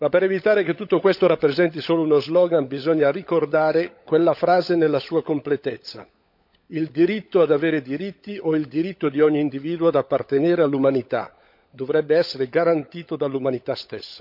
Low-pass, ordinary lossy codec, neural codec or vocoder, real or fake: 5.4 kHz; none; codec, 16 kHz, 16 kbps, FunCodec, trained on LibriTTS, 50 frames a second; fake